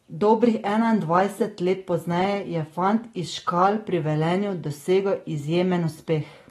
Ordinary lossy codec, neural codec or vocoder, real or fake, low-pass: AAC, 32 kbps; none; real; 14.4 kHz